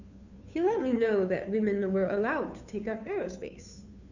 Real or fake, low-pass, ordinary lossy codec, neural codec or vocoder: fake; 7.2 kHz; none; codec, 16 kHz, 2 kbps, FunCodec, trained on Chinese and English, 25 frames a second